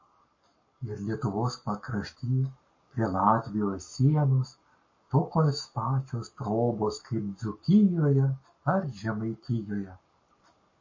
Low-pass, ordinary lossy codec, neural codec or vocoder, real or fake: 7.2 kHz; MP3, 32 kbps; codec, 44.1 kHz, 7.8 kbps, Pupu-Codec; fake